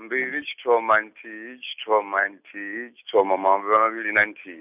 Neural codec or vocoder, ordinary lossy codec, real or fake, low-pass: none; none; real; 3.6 kHz